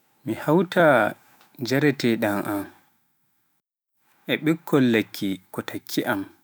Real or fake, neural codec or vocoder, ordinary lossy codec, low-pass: fake; autoencoder, 48 kHz, 128 numbers a frame, DAC-VAE, trained on Japanese speech; none; none